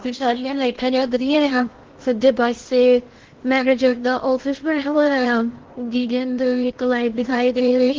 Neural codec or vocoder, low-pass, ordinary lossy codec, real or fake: codec, 16 kHz in and 24 kHz out, 0.6 kbps, FocalCodec, streaming, 4096 codes; 7.2 kHz; Opus, 16 kbps; fake